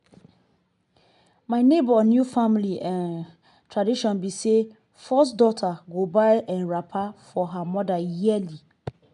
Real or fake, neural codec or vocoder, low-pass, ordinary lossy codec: real; none; 10.8 kHz; none